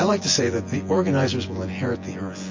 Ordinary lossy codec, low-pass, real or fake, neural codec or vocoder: MP3, 32 kbps; 7.2 kHz; fake; vocoder, 24 kHz, 100 mel bands, Vocos